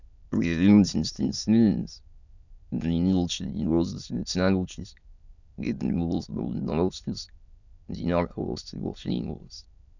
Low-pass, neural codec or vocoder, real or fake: 7.2 kHz; autoencoder, 22.05 kHz, a latent of 192 numbers a frame, VITS, trained on many speakers; fake